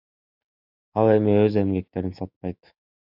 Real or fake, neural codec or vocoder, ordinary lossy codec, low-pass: fake; autoencoder, 48 kHz, 128 numbers a frame, DAC-VAE, trained on Japanese speech; AAC, 48 kbps; 5.4 kHz